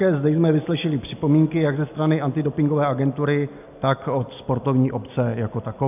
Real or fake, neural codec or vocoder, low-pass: real; none; 3.6 kHz